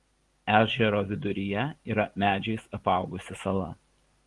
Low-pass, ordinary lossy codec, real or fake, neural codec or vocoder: 10.8 kHz; Opus, 24 kbps; real; none